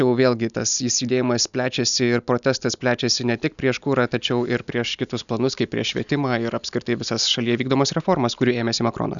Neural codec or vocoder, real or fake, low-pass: none; real; 7.2 kHz